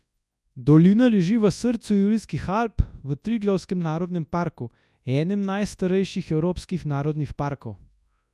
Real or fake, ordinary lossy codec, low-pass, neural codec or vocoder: fake; none; none; codec, 24 kHz, 0.9 kbps, WavTokenizer, large speech release